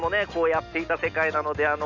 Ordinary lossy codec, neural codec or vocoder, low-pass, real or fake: none; none; 7.2 kHz; real